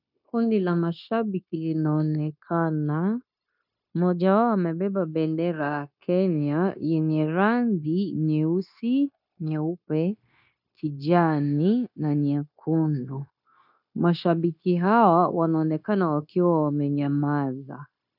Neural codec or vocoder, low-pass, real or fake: codec, 16 kHz, 0.9 kbps, LongCat-Audio-Codec; 5.4 kHz; fake